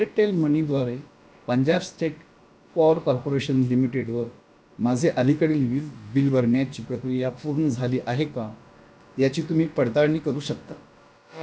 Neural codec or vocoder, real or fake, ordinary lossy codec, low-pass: codec, 16 kHz, about 1 kbps, DyCAST, with the encoder's durations; fake; none; none